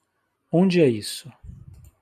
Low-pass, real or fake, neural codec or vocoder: 14.4 kHz; real; none